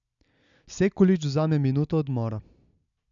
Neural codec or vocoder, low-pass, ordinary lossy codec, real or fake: none; 7.2 kHz; MP3, 96 kbps; real